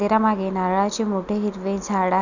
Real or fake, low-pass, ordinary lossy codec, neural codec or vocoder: real; 7.2 kHz; none; none